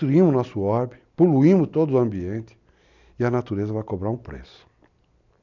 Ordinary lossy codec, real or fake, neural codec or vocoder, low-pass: none; real; none; 7.2 kHz